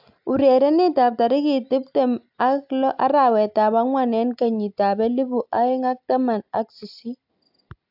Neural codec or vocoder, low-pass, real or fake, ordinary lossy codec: none; 5.4 kHz; real; AAC, 48 kbps